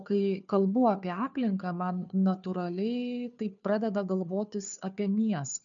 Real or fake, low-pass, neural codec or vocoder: fake; 7.2 kHz; codec, 16 kHz, 2 kbps, FunCodec, trained on Chinese and English, 25 frames a second